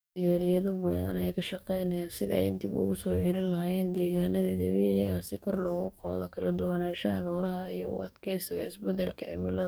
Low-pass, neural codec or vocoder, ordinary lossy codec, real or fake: none; codec, 44.1 kHz, 2.6 kbps, DAC; none; fake